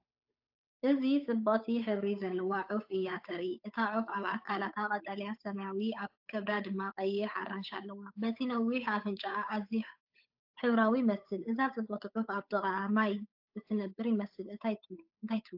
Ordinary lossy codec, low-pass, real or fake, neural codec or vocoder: AAC, 48 kbps; 5.4 kHz; fake; codec, 16 kHz, 8 kbps, FunCodec, trained on Chinese and English, 25 frames a second